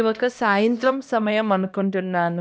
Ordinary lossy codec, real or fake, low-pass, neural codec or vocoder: none; fake; none; codec, 16 kHz, 1 kbps, X-Codec, HuBERT features, trained on LibriSpeech